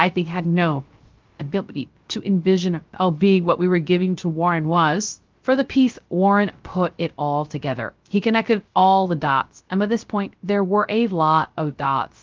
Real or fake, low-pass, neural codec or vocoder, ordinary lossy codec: fake; 7.2 kHz; codec, 16 kHz, 0.3 kbps, FocalCodec; Opus, 32 kbps